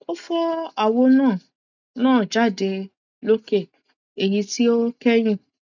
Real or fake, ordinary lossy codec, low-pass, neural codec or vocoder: real; none; 7.2 kHz; none